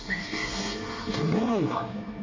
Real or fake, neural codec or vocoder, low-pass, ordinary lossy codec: fake; codec, 24 kHz, 1 kbps, SNAC; 7.2 kHz; MP3, 32 kbps